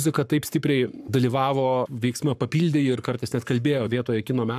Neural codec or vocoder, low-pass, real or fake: codec, 44.1 kHz, 7.8 kbps, Pupu-Codec; 14.4 kHz; fake